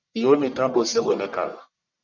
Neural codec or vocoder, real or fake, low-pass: codec, 44.1 kHz, 1.7 kbps, Pupu-Codec; fake; 7.2 kHz